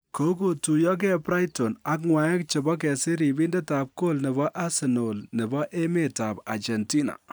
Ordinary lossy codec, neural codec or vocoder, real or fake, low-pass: none; none; real; none